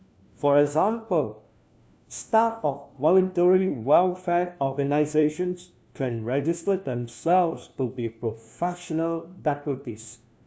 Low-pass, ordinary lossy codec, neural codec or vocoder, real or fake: none; none; codec, 16 kHz, 1 kbps, FunCodec, trained on LibriTTS, 50 frames a second; fake